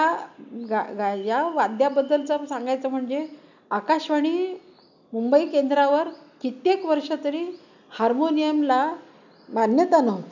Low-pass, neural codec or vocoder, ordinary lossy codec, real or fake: 7.2 kHz; none; none; real